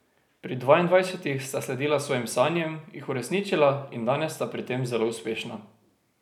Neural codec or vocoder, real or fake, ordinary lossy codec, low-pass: none; real; none; 19.8 kHz